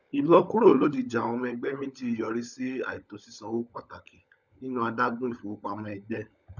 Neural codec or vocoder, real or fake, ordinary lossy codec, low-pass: codec, 16 kHz, 16 kbps, FunCodec, trained on LibriTTS, 50 frames a second; fake; none; 7.2 kHz